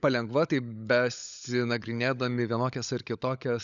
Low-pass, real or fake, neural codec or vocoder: 7.2 kHz; fake; codec, 16 kHz, 16 kbps, FunCodec, trained on LibriTTS, 50 frames a second